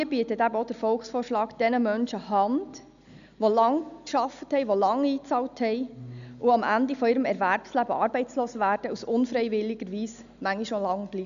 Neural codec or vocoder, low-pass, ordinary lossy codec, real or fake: none; 7.2 kHz; none; real